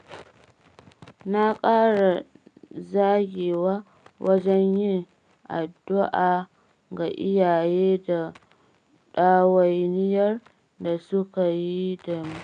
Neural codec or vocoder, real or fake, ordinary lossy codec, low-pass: none; real; none; 9.9 kHz